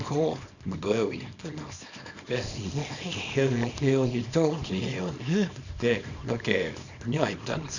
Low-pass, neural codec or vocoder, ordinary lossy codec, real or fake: 7.2 kHz; codec, 24 kHz, 0.9 kbps, WavTokenizer, small release; none; fake